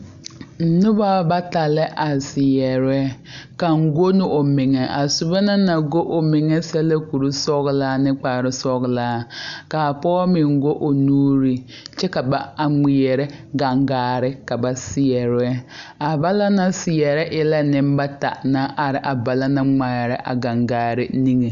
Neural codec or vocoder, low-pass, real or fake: none; 7.2 kHz; real